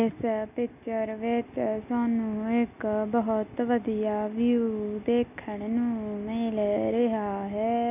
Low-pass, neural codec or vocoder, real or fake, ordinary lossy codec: 3.6 kHz; none; real; none